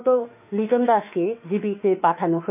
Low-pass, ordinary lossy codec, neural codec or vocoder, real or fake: 3.6 kHz; none; autoencoder, 48 kHz, 32 numbers a frame, DAC-VAE, trained on Japanese speech; fake